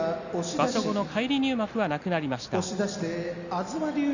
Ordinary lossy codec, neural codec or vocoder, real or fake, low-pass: none; none; real; 7.2 kHz